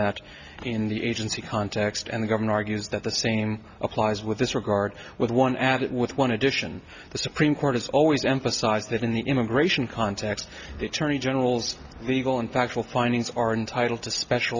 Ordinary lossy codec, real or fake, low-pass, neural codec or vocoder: Opus, 64 kbps; real; 7.2 kHz; none